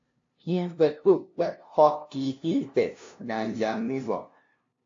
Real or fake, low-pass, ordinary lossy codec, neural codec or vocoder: fake; 7.2 kHz; AAC, 48 kbps; codec, 16 kHz, 0.5 kbps, FunCodec, trained on LibriTTS, 25 frames a second